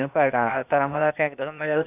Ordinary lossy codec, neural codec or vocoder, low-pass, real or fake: none; codec, 16 kHz, 0.8 kbps, ZipCodec; 3.6 kHz; fake